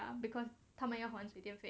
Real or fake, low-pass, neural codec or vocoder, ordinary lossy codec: real; none; none; none